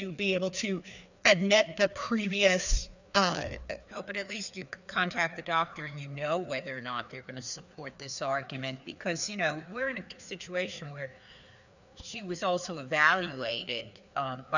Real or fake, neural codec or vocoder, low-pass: fake; codec, 16 kHz, 2 kbps, FreqCodec, larger model; 7.2 kHz